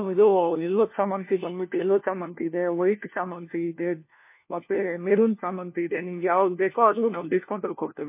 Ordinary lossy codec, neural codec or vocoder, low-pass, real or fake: MP3, 24 kbps; codec, 16 kHz, 1 kbps, FunCodec, trained on LibriTTS, 50 frames a second; 3.6 kHz; fake